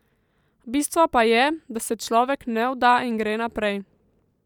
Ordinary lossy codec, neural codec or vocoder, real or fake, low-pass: none; vocoder, 44.1 kHz, 128 mel bands every 512 samples, BigVGAN v2; fake; 19.8 kHz